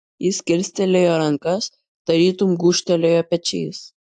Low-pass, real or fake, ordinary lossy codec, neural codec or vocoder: 10.8 kHz; real; AAC, 64 kbps; none